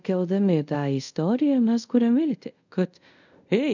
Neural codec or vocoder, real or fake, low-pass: codec, 24 kHz, 0.5 kbps, DualCodec; fake; 7.2 kHz